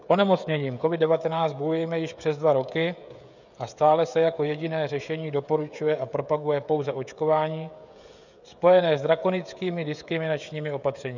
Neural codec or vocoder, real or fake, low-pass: codec, 16 kHz, 16 kbps, FreqCodec, smaller model; fake; 7.2 kHz